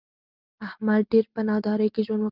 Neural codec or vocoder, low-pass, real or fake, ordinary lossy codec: none; 5.4 kHz; real; Opus, 24 kbps